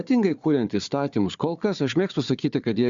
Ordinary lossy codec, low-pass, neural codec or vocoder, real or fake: Opus, 64 kbps; 7.2 kHz; codec, 16 kHz, 4 kbps, FunCodec, trained on Chinese and English, 50 frames a second; fake